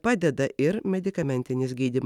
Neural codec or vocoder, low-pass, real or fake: vocoder, 44.1 kHz, 128 mel bands every 256 samples, BigVGAN v2; 19.8 kHz; fake